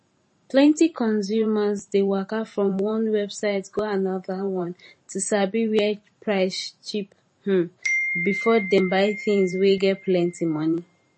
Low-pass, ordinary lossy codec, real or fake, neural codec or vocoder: 10.8 kHz; MP3, 32 kbps; fake; vocoder, 44.1 kHz, 128 mel bands every 512 samples, BigVGAN v2